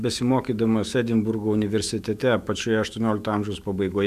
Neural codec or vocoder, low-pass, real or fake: autoencoder, 48 kHz, 128 numbers a frame, DAC-VAE, trained on Japanese speech; 14.4 kHz; fake